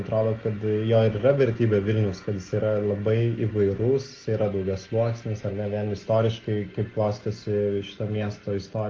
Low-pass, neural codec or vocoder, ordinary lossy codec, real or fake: 7.2 kHz; none; Opus, 16 kbps; real